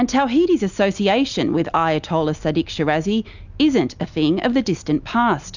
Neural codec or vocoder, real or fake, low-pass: none; real; 7.2 kHz